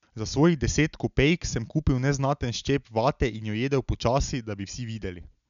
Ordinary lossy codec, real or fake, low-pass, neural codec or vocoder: none; real; 7.2 kHz; none